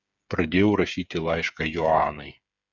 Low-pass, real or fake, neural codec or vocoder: 7.2 kHz; fake; codec, 16 kHz, 8 kbps, FreqCodec, smaller model